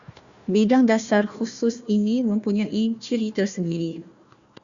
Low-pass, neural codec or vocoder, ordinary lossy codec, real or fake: 7.2 kHz; codec, 16 kHz, 1 kbps, FunCodec, trained on Chinese and English, 50 frames a second; Opus, 64 kbps; fake